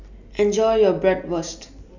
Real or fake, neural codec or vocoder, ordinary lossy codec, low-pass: real; none; none; 7.2 kHz